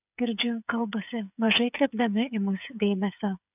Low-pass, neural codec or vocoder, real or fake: 3.6 kHz; codec, 16 kHz, 8 kbps, FreqCodec, smaller model; fake